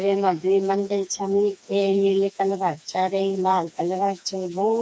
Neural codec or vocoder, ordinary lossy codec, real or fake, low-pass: codec, 16 kHz, 2 kbps, FreqCodec, smaller model; none; fake; none